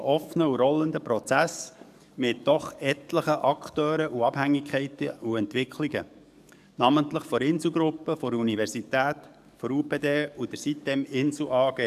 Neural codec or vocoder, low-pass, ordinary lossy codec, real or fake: codec, 44.1 kHz, 7.8 kbps, DAC; 14.4 kHz; none; fake